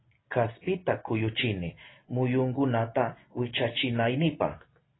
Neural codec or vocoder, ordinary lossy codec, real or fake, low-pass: none; AAC, 16 kbps; real; 7.2 kHz